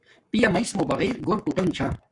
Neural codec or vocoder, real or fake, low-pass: codec, 44.1 kHz, 7.8 kbps, Pupu-Codec; fake; 10.8 kHz